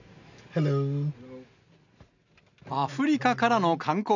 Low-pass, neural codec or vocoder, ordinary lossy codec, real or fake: 7.2 kHz; none; none; real